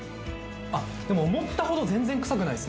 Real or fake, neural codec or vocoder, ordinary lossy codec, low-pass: real; none; none; none